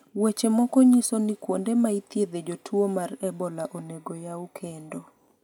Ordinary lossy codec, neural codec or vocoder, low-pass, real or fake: none; none; 19.8 kHz; real